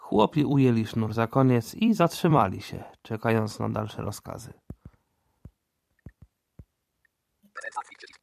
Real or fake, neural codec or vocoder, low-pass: fake; vocoder, 44.1 kHz, 128 mel bands every 256 samples, BigVGAN v2; 14.4 kHz